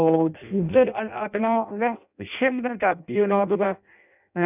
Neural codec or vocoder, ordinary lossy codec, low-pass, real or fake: codec, 16 kHz in and 24 kHz out, 0.6 kbps, FireRedTTS-2 codec; none; 3.6 kHz; fake